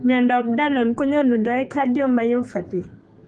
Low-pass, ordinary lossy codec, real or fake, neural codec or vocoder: 10.8 kHz; Opus, 24 kbps; fake; codec, 32 kHz, 1.9 kbps, SNAC